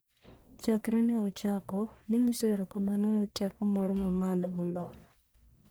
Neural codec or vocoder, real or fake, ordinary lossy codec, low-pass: codec, 44.1 kHz, 1.7 kbps, Pupu-Codec; fake; none; none